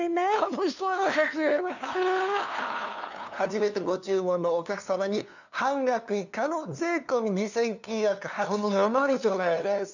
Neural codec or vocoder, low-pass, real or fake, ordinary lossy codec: codec, 16 kHz, 2 kbps, FunCodec, trained on LibriTTS, 25 frames a second; 7.2 kHz; fake; none